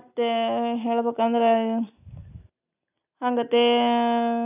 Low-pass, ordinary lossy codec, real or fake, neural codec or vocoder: 3.6 kHz; none; real; none